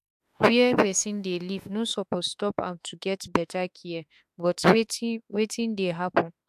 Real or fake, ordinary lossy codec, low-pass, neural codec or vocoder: fake; AAC, 96 kbps; 14.4 kHz; autoencoder, 48 kHz, 32 numbers a frame, DAC-VAE, trained on Japanese speech